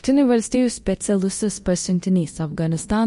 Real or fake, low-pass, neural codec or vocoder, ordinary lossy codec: fake; 10.8 kHz; codec, 24 kHz, 0.9 kbps, DualCodec; MP3, 48 kbps